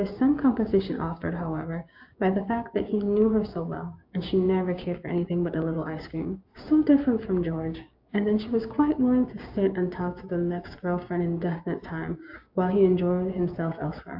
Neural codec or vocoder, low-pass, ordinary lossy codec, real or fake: codec, 16 kHz, 6 kbps, DAC; 5.4 kHz; AAC, 48 kbps; fake